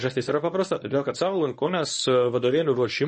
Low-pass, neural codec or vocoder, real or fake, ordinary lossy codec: 10.8 kHz; codec, 24 kHz, 0.9 kbps, WavTokenizer, medium speech release version 1; fake; MP3, 32 kbps